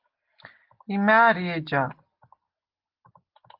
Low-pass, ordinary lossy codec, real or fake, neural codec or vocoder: 5.4 kHz; Opus, 32 kbps; real; none